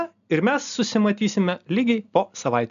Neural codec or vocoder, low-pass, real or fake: none; 7.2 kHz; real